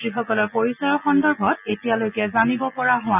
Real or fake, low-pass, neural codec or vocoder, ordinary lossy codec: real; 3.6 kHz; none; none